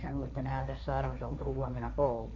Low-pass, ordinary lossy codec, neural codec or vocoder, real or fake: 7.2 kHz; none; codec, 16 kHz, 1.1 kbps, Voila-Tokenizer; fake